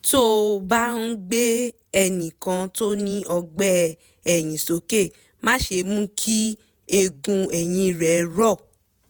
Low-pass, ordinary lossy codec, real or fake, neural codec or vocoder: none; none; fake; vocoder, 48 kHz, 128 mel bands, Vocos